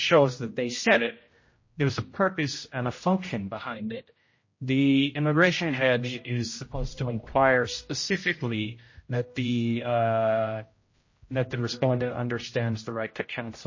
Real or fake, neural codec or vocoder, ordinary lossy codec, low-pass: fake; codec, 16 kHz, 0.5 kbps, X-Codec, HuBERT features, trained on general audio; MP3, 32 kbps; 7.2 kHz